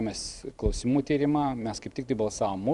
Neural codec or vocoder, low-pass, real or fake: vocoder, 44.1 kHz, 128 mel bands every 512 samples, BigVGAN v2; 10.8 kHz; fake